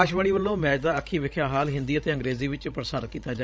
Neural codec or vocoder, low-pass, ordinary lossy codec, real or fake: codec, 16 kHz, 16 kbps, FreqCodec, larger model; none; none; fake